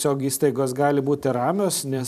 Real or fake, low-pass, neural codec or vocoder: fake; 14.4 kHz; vocoder, 44.1 kHz, 128 mel bands every 512 samples, BigVGAN v2